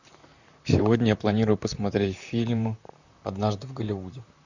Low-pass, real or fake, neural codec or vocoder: 7.2 kHz; fake; vocoder, 44.1 kHz, 128 mel bands, Pupu-Vocoder